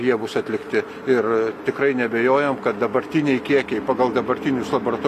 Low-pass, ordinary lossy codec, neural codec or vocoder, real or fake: 14.4 kHz; AAC, 96 kbps; none; real